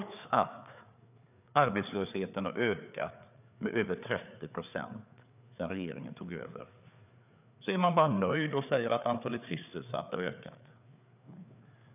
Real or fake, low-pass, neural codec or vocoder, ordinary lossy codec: fake; 3.6 kHz; codec, 16 kHz, 4 kbps, FreqCodec, larger model; none